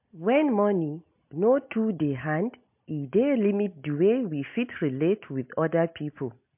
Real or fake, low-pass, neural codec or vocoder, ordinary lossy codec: real; 3.6 kHz; none; MP3, 32 kbps